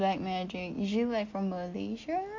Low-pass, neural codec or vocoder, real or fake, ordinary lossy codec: 7.2 kHz; none; real; MP3, 48 kbps